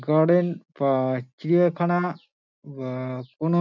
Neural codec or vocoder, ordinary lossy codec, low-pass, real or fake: none; MP3, 64 kbps; 7.2 kHz; real